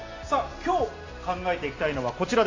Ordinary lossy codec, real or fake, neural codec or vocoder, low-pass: AAC, 32 kbps; real; none; 7.2 kHz